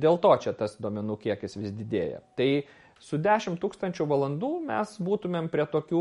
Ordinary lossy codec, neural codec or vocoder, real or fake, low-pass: MP3, 48 kbps; none; real; 19.8 kHz